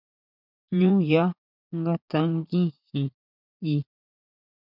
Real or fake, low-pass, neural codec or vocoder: fake; 5.4 kHz; vocoder, 44.1 kHz, 80 mel bands, Vocos